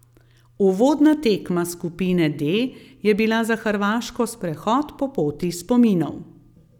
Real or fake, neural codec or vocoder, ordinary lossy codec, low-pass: real; none; none; 19.8 kHz